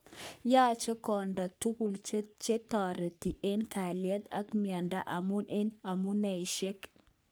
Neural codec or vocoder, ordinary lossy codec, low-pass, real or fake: codec, 44.1 kHz, 3.4 kbps, Pupu-Codec; none; none; fake